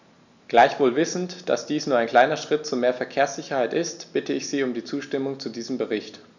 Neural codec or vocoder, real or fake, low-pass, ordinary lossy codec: none; real; 7.2 kHz; none